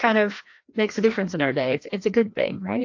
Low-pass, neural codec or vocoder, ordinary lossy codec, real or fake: 7.2 kHz; codec, 16 kHz, 1 kbps, FreqCodec, larger model; AAC, 48 kbps; fake